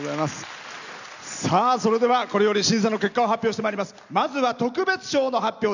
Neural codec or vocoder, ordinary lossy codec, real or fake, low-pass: none; none; real; 7.2 kHz